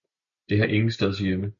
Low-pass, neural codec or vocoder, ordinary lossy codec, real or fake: 7.2 kHz; none; MP3, 96 kbps; real